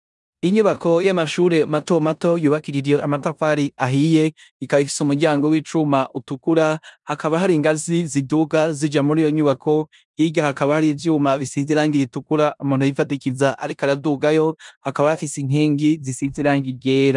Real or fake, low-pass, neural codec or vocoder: fake; 10.8 kHz; codec, 16 kHz in and 24 kHz out, 0.9 kbps, LongCat-Audio-Codec, four codebook decoder